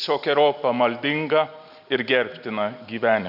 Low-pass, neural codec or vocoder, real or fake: 5.4 kHz; none; real